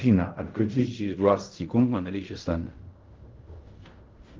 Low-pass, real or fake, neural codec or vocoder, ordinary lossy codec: 7.2 kHz; fake; codec, 16 kHz in and 24 kHz out, 0.4 kbps, LongCat-Audio-Codec, fine tuned four codebook decoder; Opus, 32 kbps